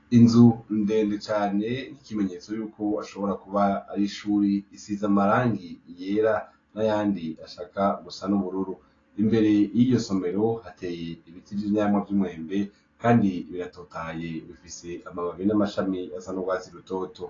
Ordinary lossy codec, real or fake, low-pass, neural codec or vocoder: AAC, 32 kbps; real; 7.2 kHz; none